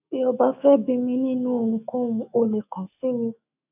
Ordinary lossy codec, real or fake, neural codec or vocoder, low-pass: none; fake; autoencoder, 48 kHz, 128 numbers a frame, DAC-VAE, trained on Japanese speech; 3.6 kHz